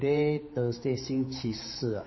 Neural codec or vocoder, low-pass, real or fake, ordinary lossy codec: codec, 16 kHz, 4 kbps, X-Codec, HuBERT features, trained on general audio; 7.2 kHz; fake; MP3, 24 kbps